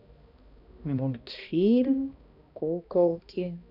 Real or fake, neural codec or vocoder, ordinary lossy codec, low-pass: fake; codec, 16 kHz, 1 kbps, X-Codec, HuBERT features, trained on balanced general audio; none; 5.4 kHz